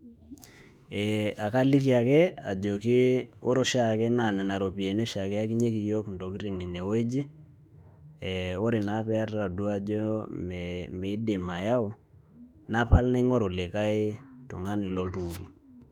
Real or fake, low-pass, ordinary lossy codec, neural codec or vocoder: fake; 19.8 kHz; none; autoencoder, 48 kHz, 32 numbers a frame, DAC-VAE, trained on Japanese speech